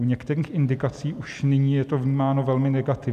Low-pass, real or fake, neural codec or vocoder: 14.4 kHz; real; none